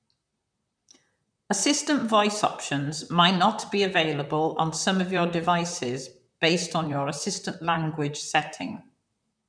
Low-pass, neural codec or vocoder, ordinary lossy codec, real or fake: 9.9 kHz; vocoder, 44.1 kHz, 128 mel bands, Pupu-Vocoder; none; fake